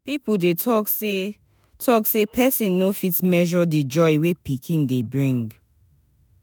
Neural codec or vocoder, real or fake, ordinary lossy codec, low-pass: autoencoder, 48 kHz, 32 numbers a frame, DAC-VAE, trained on Japanese speech; fake; none; none